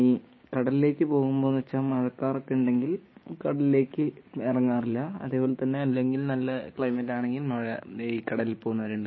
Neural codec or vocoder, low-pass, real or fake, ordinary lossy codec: codec, 16 kHz, 6 kbps, DAC; 7.2 kHz; fake; MP3, 24 kbps